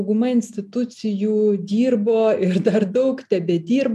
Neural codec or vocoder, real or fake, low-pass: none; real; 14.4 kHz